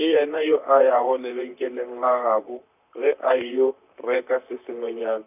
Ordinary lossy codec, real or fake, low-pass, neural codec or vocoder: none; fake; 3.6 kHz; vocoder, 44.1 kHz, 128 mel bands, Pupu-Vocoder